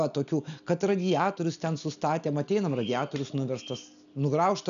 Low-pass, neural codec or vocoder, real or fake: 7.2 kHz; none; real